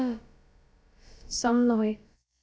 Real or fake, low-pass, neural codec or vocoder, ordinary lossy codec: fake; none; codec, 16 kHz, about 1 kbps, DyCAST, with the encoder's durations; none